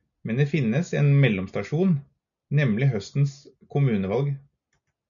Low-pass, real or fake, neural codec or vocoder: 7.2 kHz; real; none